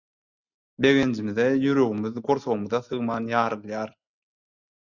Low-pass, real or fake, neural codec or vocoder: 7.2 kHz; real; none